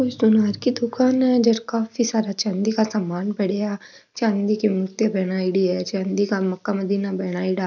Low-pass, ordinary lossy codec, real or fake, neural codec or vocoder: 7.2 kHz; none; real; none